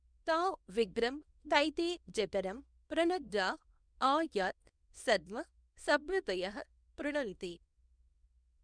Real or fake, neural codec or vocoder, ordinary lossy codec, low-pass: fake; codec, 24 kHz, 0.9 kbps, WavTokenizer, small release; none; 9.9 kHz